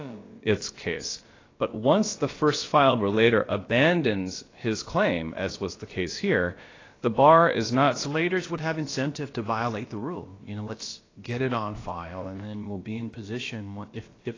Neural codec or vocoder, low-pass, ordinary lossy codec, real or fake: codec, 16 kHz, about 1 kbps, DyCAST, with the encoder's durations; 7.2 kHz; AAC, 32 kbps; fake